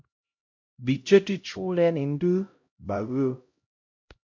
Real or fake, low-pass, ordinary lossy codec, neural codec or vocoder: fake; 7.2 kHz; MP3, 48 kbps; codec, 16 kHz, 0.5 kbps, X-Codec, HuBERT features, trained on LibriSpeech